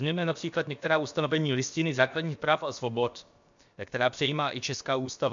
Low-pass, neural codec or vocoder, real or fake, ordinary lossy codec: 7.2 kHz; codec, 16 kHz, about 1 kbps, DyCAST, with the encoder's durations; fake; MP3, 64 kbps